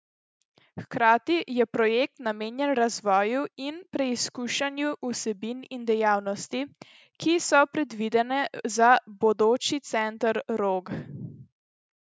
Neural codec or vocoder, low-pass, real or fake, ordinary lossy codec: none; none; real; none